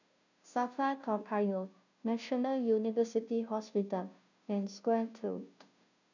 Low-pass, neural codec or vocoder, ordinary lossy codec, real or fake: 7.2 kHz; codec, 16 kHz, 0.5 kbps, FunCodec, trained on Chinese and English, 25 frames a second; none; fake